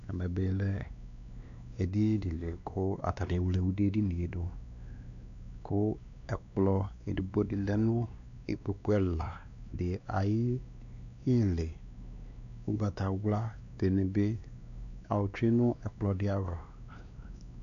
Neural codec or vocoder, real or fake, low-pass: codec, 16 kHz, 2 kbps, X-Codec, WavLM features, trained on Multilingual LibriSpeech; fake; 7.2 kHz